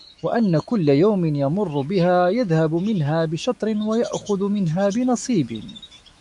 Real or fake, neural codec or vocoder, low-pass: fake; autoencoder, 48 kHz, 128 numbers a frame, DAC-VAE, trained on Japanese speech; 10.8 kHz